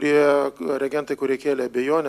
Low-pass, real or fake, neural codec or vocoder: 14.4 kHz; real; none